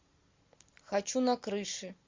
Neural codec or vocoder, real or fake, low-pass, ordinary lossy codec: none; real; 7.2 kHz; MP3, 64 kbps